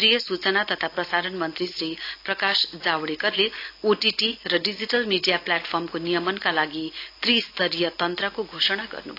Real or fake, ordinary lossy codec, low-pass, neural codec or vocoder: real; AAC, 32 kbps; 5.4 kHz; none